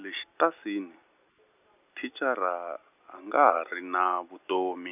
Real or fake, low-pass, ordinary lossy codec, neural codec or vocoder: real; 3.6 kHz; none; none